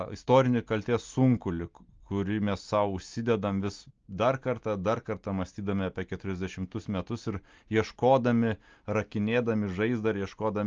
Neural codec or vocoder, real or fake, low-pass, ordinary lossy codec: none; real; 7.2 kHz; Opus, 24 kbps